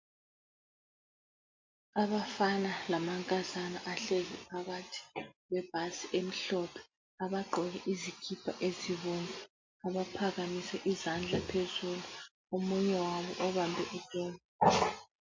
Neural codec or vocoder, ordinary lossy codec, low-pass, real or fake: none; MP3, 48 kbps; 7.2 kHz; real